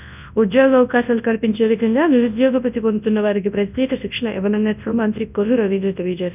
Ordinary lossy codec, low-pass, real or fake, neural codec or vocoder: none; 3.6 kHz; fake; codec, 24 kHz, 0.9 kbps, WavTokenizer, large speech release